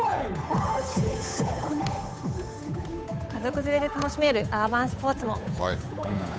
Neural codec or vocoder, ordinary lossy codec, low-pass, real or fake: codec, 16 kHz, 8 kbps, FunCodec, trained on Chinese and English, 25 frames a second; none; none; fake